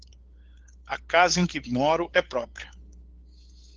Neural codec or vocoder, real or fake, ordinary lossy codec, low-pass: codec, 16 kHz, 8 kbps, FunCodec, trained on LibriTTS, 25 frames a second; fake; Opus, 16 kbps; 7.2 kHz